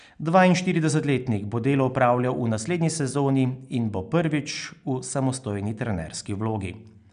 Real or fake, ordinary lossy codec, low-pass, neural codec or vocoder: real; none; 9.9 kHz; none